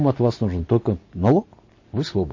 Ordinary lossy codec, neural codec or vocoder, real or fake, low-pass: MP3, 32 kbps; none; real; 7.2 kHz